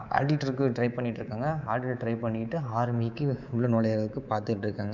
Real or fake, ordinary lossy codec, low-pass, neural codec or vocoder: fake; none; 7.2 kHz; codec, 24 kHz, 3.1 kbps, DualCodec